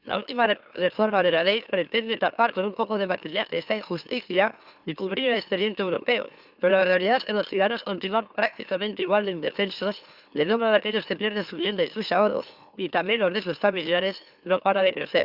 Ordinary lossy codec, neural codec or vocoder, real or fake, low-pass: Opus, 64 kbps; autoencoder, 44.1 kHz, a latent of 192 numbers a frame, MeloTTS; fake; 5.4 kHz